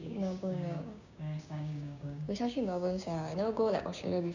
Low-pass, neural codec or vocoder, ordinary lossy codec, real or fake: 7.2 kHz; codec, 16 kHz, 6 kbps, DAC; none; fake